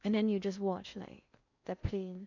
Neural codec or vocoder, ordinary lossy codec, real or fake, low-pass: codec, 16 kHz in and 24 kHz out, 0.6 kbps, FocalCodec, streaming, 2048 codes; none; fake; 7.2 kHz